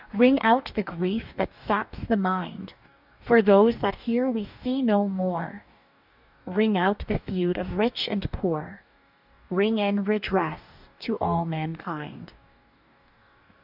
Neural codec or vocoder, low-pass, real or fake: codec, 44.1 kHz, 2.6 kbps, DAC; 5.4 kHz; fake